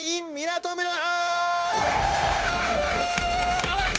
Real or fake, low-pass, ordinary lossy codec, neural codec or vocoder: fake; none; none; codec, 16 kHz, 0.9 kbps, LongCat-Audio-Codec